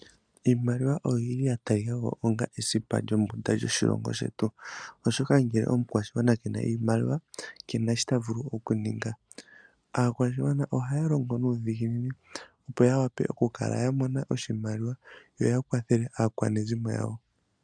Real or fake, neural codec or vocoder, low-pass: real; none; 9.9 kHz